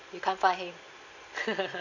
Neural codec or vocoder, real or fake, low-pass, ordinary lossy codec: none; real; 7.2 kHz; none